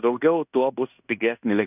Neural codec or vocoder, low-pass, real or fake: codec, 16 kHz in and 24 kHz out, 0.9 kbps, LongCat-Audio-Codec, fine tuned four codebook decoder; 3.6 kHz; fake